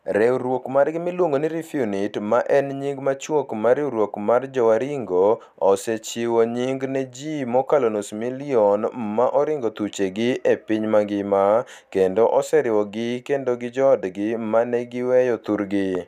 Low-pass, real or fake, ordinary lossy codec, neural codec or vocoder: 14.4 kHz; real; none; none